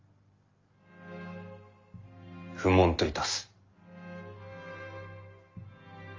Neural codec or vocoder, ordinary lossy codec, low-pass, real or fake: none; Opus, 32 kbps; 7.2 kHz; real